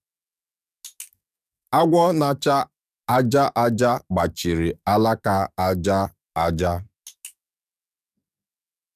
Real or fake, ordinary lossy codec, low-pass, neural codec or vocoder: fake; none; 14.4 kHz; codec, 44.1 kHz, 7.8 kbps, DAC